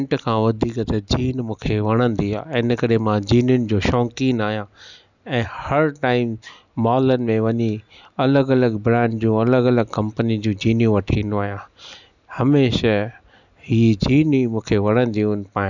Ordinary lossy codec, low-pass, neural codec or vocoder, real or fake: none; 7.2 kHz; none; real